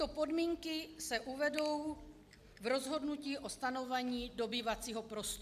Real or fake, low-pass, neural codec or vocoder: real; 14.4 kHz; none